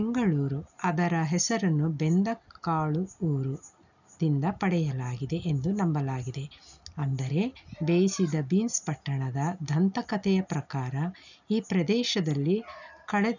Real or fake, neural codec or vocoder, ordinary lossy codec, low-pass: real; none; none; 7.2 kHz